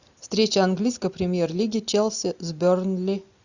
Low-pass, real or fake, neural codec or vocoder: 7.2 kHz; real; none